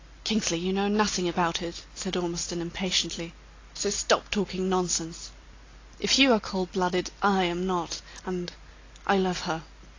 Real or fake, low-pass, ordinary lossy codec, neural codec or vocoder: real; 7.2 kHz; AAC, 32 kbps; none